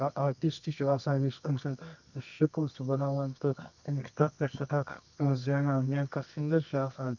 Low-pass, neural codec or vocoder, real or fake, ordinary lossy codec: 7.2 kHz; codec, 24 kHz, 0.9 kbps, WavTokenizer, medium music audio release; fake; none